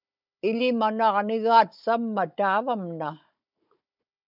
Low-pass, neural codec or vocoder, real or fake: 5.4 kHz; codec, 16 kHz, 16 kbps, FunCodec, trained on Chinese and English, 50 frames a second; fake